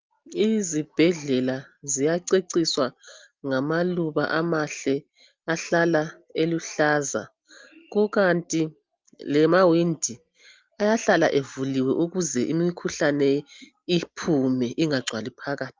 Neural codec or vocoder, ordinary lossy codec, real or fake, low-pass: none; Opus, 24 kbps; real; 7.2 kHz